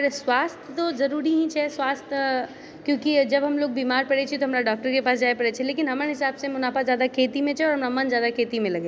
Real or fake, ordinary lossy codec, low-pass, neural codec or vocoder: real; none; none; none